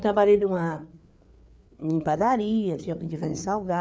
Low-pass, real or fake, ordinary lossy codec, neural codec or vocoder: none; fake; none; codec, 16 kHz, 4 kbps, FreqCodec, larger model